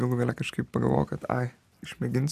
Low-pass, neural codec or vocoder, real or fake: 14.4 kHz; none; real